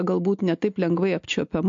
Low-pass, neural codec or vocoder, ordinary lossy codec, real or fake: 7.2 kHz; none; MP3, 48 kbps; real